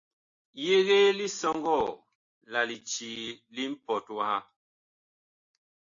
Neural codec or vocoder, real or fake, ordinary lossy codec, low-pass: none; real; AAC, 48 kbps; 7.2 kHz